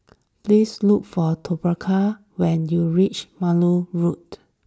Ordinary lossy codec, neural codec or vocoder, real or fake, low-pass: none; none; real; none